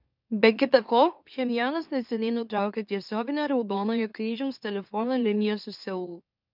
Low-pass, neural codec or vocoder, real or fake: 5.4 kHz; autoencoder, 44.1 kHz, a latent of 192 numbers a frame, MeloTTS; fake